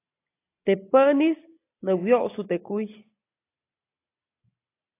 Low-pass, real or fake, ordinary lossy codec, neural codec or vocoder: 3.6 kHz; real; AAC, 24 kbps; none